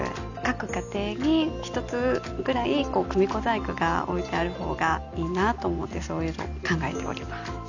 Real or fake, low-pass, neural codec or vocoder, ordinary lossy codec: real; 7.2 kHz; none; none